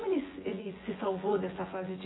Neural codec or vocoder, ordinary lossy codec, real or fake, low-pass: vocoder, 24 kHz, 100 mel bands, Vocos; AAC, 16 kbps; fake; 7.2 kHz